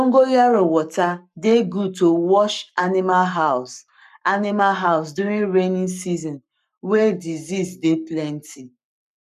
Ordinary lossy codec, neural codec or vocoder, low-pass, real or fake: none; codec, 44.1 kHz, 7.8 kbps, Pupu-Codec; 14.4 kHz; fake